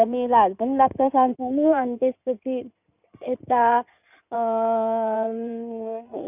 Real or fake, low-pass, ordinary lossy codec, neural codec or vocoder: fake; 3.6 kHz; none; codec, 16 kHz in and 24 kHz out, 2.2 kbps, FireRedTTS-2 codec